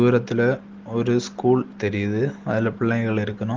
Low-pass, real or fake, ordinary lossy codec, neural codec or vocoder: 7.2 kHz; real; Opus, 16 kbps; none